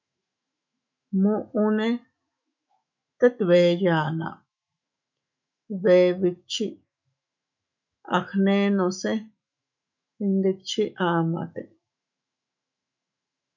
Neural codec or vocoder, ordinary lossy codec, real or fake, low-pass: autoencoder, 48 kHz, 128 numbers a frame, DAC-VAE, trained on Japanese speech; MP3, 64 kbps; fake; 7.2 kHz